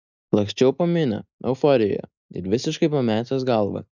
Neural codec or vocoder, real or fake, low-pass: none; real; 7.2 kHz